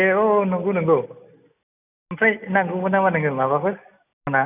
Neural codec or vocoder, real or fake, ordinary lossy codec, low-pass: none; real; none; 3.6 kHz